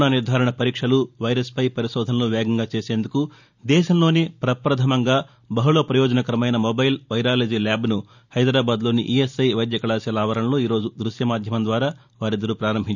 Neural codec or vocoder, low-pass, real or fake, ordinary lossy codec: none; 7.2 kHz; real; none